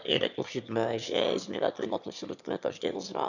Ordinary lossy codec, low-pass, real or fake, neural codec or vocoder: none; 7.2 kHz; fake; autoencoder, 22.05 kHz, a latent of 192 numbers a frame, VITS, trained on one speaker